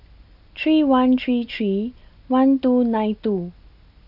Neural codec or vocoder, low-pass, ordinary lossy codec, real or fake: none; 5.4 kHz; none; real